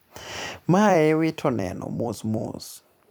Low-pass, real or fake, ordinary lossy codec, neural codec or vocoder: none; fake; none; vocoder, 44.1 kHz, 128 mel bands every 256 samples, BigVGAN v2